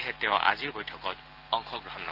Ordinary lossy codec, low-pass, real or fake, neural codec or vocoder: Opus, 16 kbps; 5.4 kHz; real; none